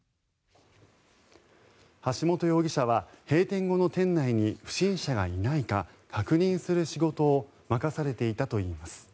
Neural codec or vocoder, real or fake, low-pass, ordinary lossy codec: none; real; none; none